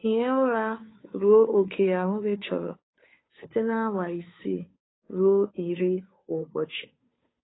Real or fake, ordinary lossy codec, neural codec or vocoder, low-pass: fake; AAC, 16 kbps; codec, 16 kHz, 2 kbps, FunCodec, trained on Chinese and English, 25 frames a second; 7.2 kHz